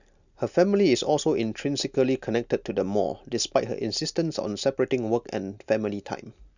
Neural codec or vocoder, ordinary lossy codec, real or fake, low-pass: none; none; real; 7.2 kHz